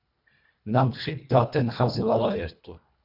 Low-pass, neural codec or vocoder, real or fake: 5.4 kHz; codec, 24 kHz, 1.5 kbps, HILCodec; fake